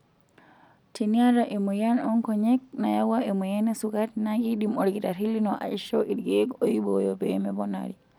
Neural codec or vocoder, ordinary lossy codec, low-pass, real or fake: none; none; 19.8 kHz; real